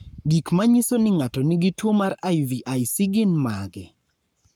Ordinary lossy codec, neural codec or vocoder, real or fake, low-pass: none; codec, 44.1 kHz, 7.8 kbps, Pupu-Codec; fake; none